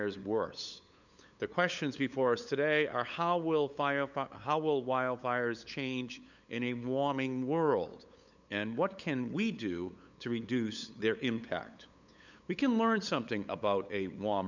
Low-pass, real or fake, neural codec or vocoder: 7.2 kHz; fake; codec, 16 kHz, 8 kbps, FunCodec, trained on LibriTTS, 25 frames a second